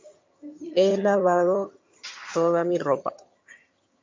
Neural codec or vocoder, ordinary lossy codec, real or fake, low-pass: vocoder, 22.05 kHz, 80 mel bands, HiFi-GAN; MP3, 48 kbps; fake; 7.2 kHz